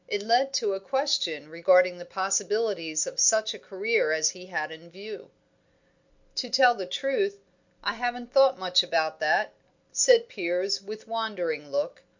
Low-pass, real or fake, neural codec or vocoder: 7.2 kHz; real; none